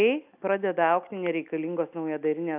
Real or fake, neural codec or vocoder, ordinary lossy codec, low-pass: real; none; AAC, 32 kbps; 3.6 kHz